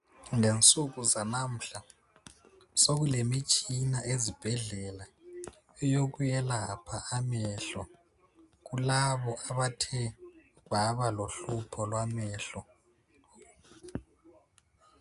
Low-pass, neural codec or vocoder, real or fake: 10.8 kHz; none; real